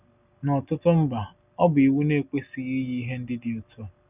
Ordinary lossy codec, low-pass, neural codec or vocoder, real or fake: none; 3.6 kHz; none; real